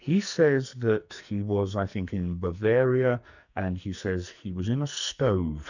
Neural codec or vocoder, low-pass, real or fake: codec, 44.1 kHz, 2.6 kbps, SNAC; 7.2 kHz; fake